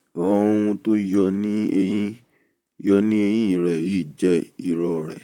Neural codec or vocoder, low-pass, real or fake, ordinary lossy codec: vocoder, 44.1 kHz, 128 mel bands, Pupu-Vocoder; 19.8 kHz; fake; none